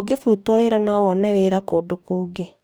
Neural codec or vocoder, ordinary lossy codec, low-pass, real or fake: codec, 44.1 kHz, 2.6 kbps, DAC; none; none; fake